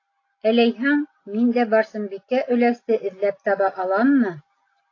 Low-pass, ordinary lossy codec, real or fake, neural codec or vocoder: 7.2 kHz; AAC, 32 kbps; real; none